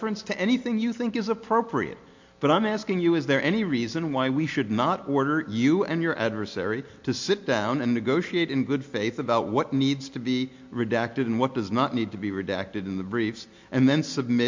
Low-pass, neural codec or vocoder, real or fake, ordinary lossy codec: 7.2 kHz; none; real; MP3, 48 kbps